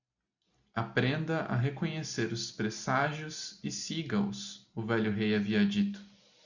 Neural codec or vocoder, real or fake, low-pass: none; real; 7.2 kHz